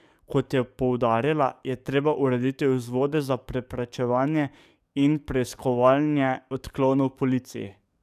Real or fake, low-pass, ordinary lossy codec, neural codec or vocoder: fake; 14.4 kHz; none; codec, 44.1 kHz, 7.8 kbps, DAC